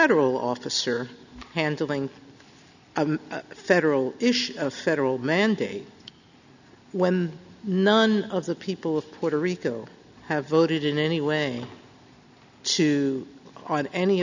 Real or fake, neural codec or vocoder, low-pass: real; none; 7.2 kHz